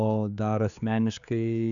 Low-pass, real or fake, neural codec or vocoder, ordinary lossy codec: 7.2 kHz; fake; codec, 16 kHz, 4 kbps, X-Codec, HuBERT features, trained on general audio; MP3, 96 kbps